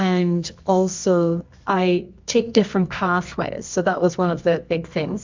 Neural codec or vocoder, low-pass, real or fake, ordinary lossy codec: codec, 24 kHz, 0.9 kbps, WavTokenizer, medium music audio release; 7.2 kHz; fake; MP3, 64 kbps